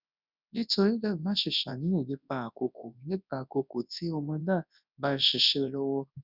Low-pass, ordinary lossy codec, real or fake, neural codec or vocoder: 5.4 kHz; none; fake; codec, 24 kHz, 0.9 kbps, WavTokenizer, large speech release